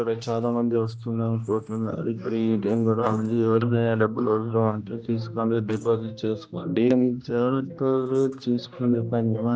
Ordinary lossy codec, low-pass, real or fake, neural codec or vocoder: none; none; fake; codec, 16 kHz, 1 kbps, X-Codec, HuBERT features, trained on general audio